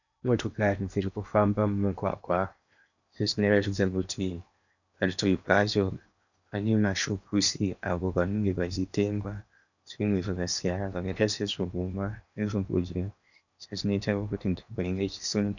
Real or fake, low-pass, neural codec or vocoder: fake; 7.2 kHz; codec, 16 kHz in and 24 kHz out, 0.8 kbps, FocalCodec, streaming, 65536 codes